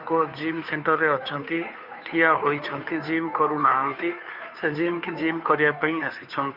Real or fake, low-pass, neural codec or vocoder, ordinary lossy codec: fake; 5.4 kHz; codec, 16 kHz, 2 kbps, FunCodec, trained on Chinese and English, 25 frames a second; none